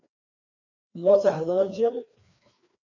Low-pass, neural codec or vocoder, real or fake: 7.2 kHz; codec, 16 kHz, 2 kbps, FreqCodec, larger model; fake